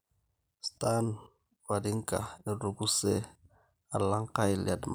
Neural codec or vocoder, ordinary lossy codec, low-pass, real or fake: none; none; none; real